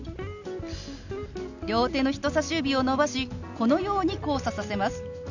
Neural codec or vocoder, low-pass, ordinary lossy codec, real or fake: none; 7.2 kHz; none; real